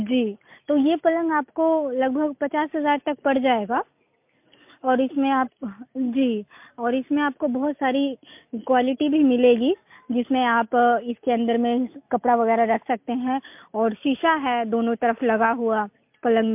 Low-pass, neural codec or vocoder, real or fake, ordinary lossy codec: 3.6 kHz; none; real; MP3, 32 kbps